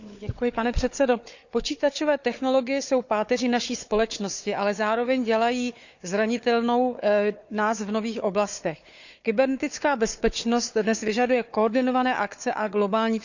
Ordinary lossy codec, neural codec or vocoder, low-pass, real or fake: none; codec, 16 kHz, 4 kbps, FunCodec, trained on Chinese and English, 50 frames a second; 7.2 kHz; fake